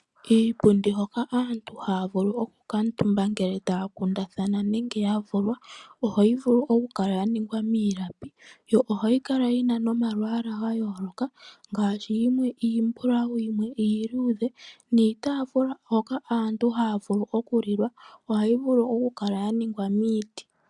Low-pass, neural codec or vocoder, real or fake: 10.8 kHz; none; real